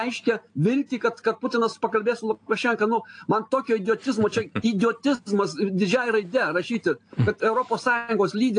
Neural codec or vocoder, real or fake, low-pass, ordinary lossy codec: none; real; 9.9 kHz; AAC, 48 kbps